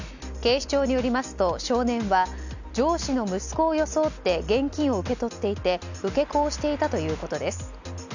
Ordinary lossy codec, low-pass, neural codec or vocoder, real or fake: none; 7.2 kHz; none; real